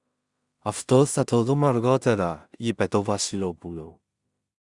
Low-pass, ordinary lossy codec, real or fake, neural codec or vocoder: 10.8 kHz; Opus, 64 kbps; fake; codec, 16 kHz in and 24 kHz out, 0.4 kbps, LongCat-Audio-Codec, two codebook decoder